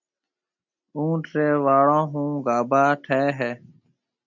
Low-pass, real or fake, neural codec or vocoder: 7.2 kHz; real; none